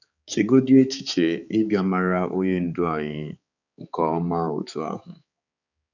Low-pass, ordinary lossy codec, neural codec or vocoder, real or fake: 7.2 kHz; none; codec, 16 kHz, 4 kbps, X-Codec, HuBERT features, trained on balanced general audio; fake